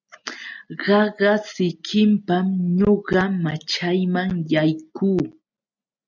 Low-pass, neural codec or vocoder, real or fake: 7.2 kHz; none; real